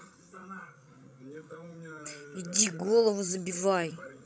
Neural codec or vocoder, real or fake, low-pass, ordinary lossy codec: codec, 16 kHz, 16 kbps, FreqCodec, larger model; fake; none; none